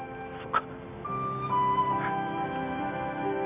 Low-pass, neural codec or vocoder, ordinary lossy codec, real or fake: 3.6 kHz; none; none; real